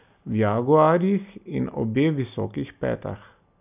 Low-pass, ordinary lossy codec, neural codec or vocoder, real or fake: 3.6 kHz; none; none; real